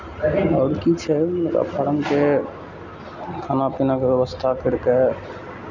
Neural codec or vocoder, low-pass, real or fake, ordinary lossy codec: none; 7.2 kHz; real; none